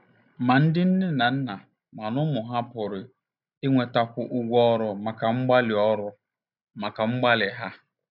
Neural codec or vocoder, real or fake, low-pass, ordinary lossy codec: none; real; 5.4 kHz; none